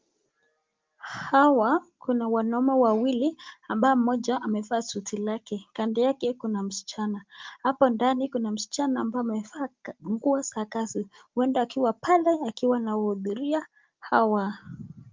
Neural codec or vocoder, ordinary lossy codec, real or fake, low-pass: none; Opus, 24 kbps; real; 7.2 kHz